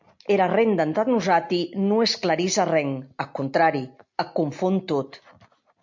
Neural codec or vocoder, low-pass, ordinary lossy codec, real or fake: none; 7.2 kHz; MP3, 64 kbps; real